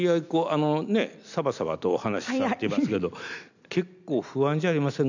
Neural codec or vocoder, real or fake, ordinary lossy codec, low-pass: none; real; none; 7.2 kHz